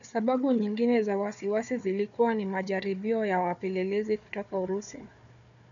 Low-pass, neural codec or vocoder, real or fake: 7.2 kHz; codec, 16 kHz, 4 kbps, FunCodec, trained on Chinese and English, 50 frames a second; fake